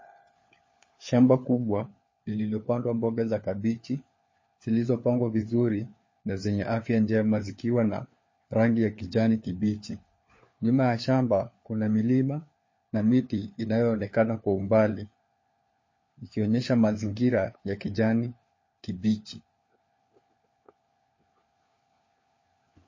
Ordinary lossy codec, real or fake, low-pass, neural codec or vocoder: MP3, 32 kbps; fake; 7.2 kHz; codec, 16 kHz, 4 kbps, FunCodec, trained on LibriTTS, 50 frames a second